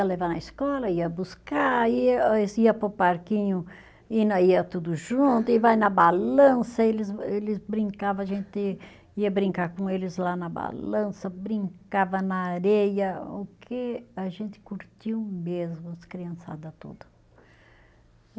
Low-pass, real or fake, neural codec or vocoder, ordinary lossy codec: none; real; none; none